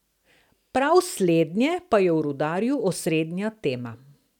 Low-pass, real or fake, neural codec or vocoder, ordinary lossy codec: 19.8 kHz; real; none; none